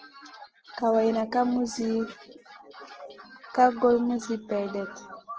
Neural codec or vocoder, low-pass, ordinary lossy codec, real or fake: none; 7.2 kHz; Opus, 16 kbps; real